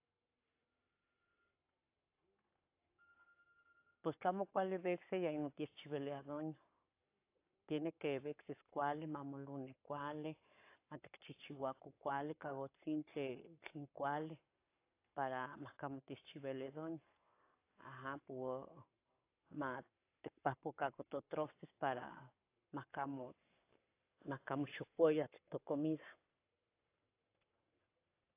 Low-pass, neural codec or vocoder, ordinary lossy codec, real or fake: 3.6 kHz; codec, 44.1 kHz, 7.8 kbps, Pupu-Codec; AAC, 24 kbps; fake